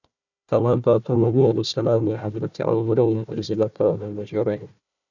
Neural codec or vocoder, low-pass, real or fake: codec, 16 kHz, 1 kbps, FunCodec, trained on Chinese and English, 50 frames a second; 7.2 kHz; fake